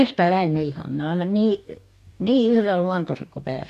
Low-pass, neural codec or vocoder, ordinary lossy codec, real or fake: 14.4 kHz; codec, 44.1 kHz, 2.6 kbps, DAC; none; fake